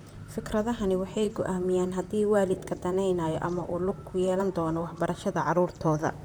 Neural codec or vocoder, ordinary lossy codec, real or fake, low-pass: vocoder, 44.1 kHz, 128 mel bands, Pupu-Vocoder; none; fake; none